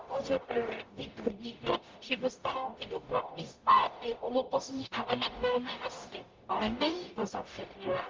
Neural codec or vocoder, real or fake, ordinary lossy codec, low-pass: codec, 44.1 kHz, 0.9 kbps, DAC; fake; Opus, 24 kbps; 7.2 kHz